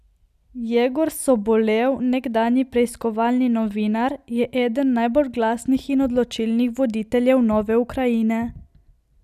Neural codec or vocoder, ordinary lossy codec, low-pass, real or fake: none; none; 14.4 kHz; real